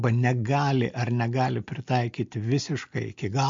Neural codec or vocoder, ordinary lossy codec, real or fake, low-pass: none; MP3, 48 kbps; real; 7.2 kHz